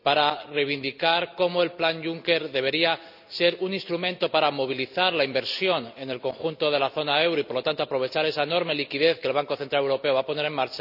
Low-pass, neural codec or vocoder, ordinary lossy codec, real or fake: 5.4 kHz; none; none; real